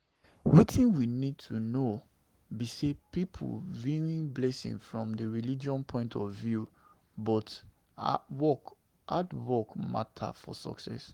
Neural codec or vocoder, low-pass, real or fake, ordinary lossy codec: codec, 44.1 kHz, 7.8 kbps, Pupu-Codec; 19.8 kHz; fake; Opus, 24 kbps